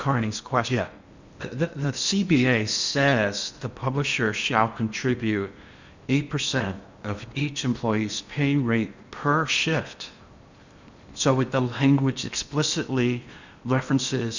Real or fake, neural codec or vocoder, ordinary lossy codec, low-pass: fake; codec, 16 kHz in and 24 kHz out, 0.8 kbps, FocalCodec, streaming, 65536 codes; Opus, 64 kbps; 7.2 kHz